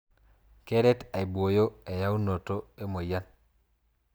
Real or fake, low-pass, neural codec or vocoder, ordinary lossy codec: real; none; none; none